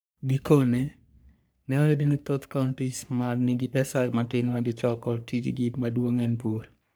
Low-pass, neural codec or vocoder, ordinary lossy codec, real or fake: none; codec, 44.1 kHz, 3.4 kbps, Pupu-Codec; none; fake